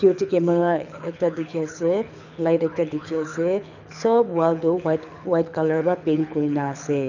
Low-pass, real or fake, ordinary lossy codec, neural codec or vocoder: 7.2 kHz; fake; none; codec, 24 kHz, 6 kbps, HILCodec